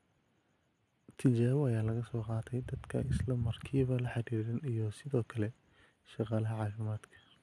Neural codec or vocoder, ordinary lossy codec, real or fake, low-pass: none; none; real; none